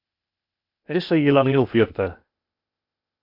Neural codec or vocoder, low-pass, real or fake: codec, 16 kHz, 0.8 kbps, ZipCodec; 5.4 kHz; fake